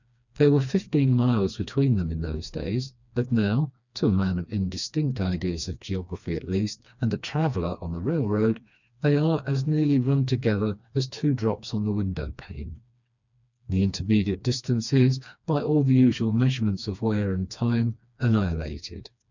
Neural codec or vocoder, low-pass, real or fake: codec, 16 kHz, 2 kbps, FreqCodec, smaller model; 7.2 kHz; fake